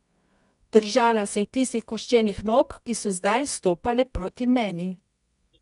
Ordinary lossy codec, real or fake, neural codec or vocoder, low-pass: none; fake; codec, 24 kHz, 0.9 kbps, WavTokenizer, medium music audio release; 10.8 kHz